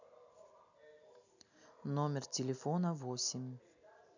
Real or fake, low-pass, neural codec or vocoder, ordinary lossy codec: real; 7.2 kHz; none; none